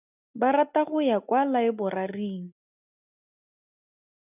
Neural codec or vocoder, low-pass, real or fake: none; 3.6 kHz; real